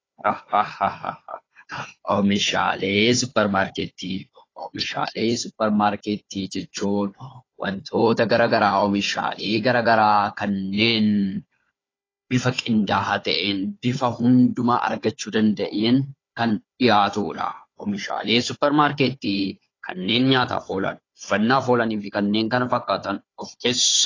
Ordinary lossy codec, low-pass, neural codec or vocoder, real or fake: AAC, 32 kbps; 7.2 kHz; codec, 16 kHz, 4 kbps, FunCodec, trained on Chinese and English, 50 frames a second; fake